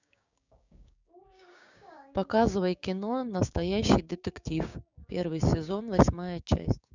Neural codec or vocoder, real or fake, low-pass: codec, 16 kHz, 6 kbps, DAC; fake; 7.2 kHz